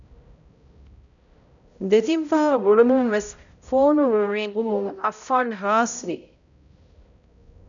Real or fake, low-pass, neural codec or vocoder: fake; 7.2 kHz; codec, 16 kHz, 0.5 kbps, X-Codec, HuBERT features, trained on balanced general audio